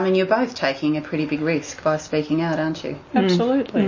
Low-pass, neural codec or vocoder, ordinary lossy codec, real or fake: 7.2 kHz; none; MP3, 32 kbps; real